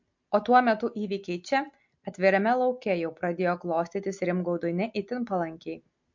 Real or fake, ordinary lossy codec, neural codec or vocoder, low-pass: real; MP3, 48 kbps; none; 7.2 kHz